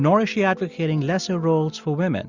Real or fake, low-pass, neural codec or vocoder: real; 7.2 kHz; none